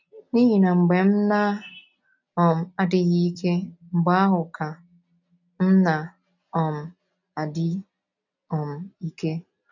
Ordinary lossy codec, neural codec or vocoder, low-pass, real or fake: none; none; 7.2 kHz; real